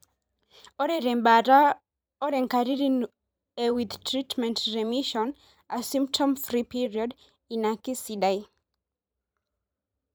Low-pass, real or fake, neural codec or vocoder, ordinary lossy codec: none; fake; vocoder, 44.1 kHz, 128 mel bands every 512 samples, BigVGAN v2; none